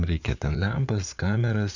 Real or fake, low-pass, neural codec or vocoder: fake; 7.2 kHz; vocoder, 44.1 kHz, 80 mel bands, Vocos